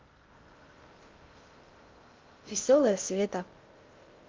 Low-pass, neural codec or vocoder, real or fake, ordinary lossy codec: 7.2 kHz; codec, 16 kHz in and 24 kHz out, 0.6 kbps, FocalCodec, streaming, 2048 codes; fake; Opus, 32 kbps